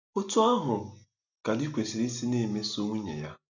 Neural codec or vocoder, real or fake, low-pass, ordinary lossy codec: none; real; 7.2 kHz; none